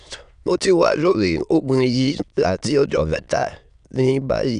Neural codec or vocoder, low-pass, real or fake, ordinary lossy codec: autoencoder, 22.05 kHz, a latent of 192 numbers a frame, VITS, trained on many speakers; 9.9 kHz; fake; none